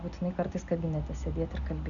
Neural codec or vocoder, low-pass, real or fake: none; 7.2 kHz; real